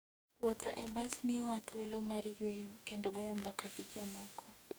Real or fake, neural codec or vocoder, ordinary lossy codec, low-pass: fake; codec, 44.1 kHz, 2.6 kbps, DAC; none; none